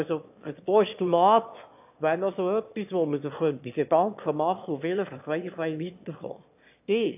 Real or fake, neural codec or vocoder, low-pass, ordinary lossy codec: fake; autoencoder, 22.05 kHz, a latent of 192 numbers a frame, VITS, trained on one speaker; 3.6 kHz; AAC, 32 kbps